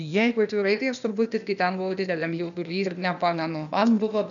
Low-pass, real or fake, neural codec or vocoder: 7.2 kHz; fake; codec, 16 kHz, 0.8 kbps, ZipCodec